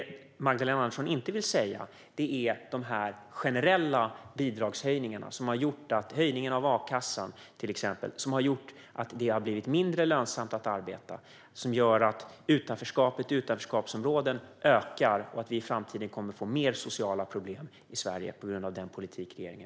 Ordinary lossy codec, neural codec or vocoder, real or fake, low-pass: none; none; real; none